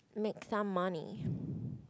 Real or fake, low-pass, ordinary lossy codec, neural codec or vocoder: real; none; none; none